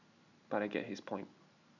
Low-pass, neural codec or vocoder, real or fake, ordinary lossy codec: 7.2 kHz; none; real; none